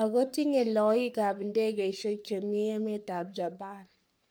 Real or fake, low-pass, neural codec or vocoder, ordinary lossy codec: fake; none; codec, 44.1 kHz, 3.4 kbps, Pupu-Codec; none